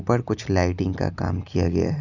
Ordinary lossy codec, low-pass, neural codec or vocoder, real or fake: Opus, 64 kbps; 7.2 kHz; none; real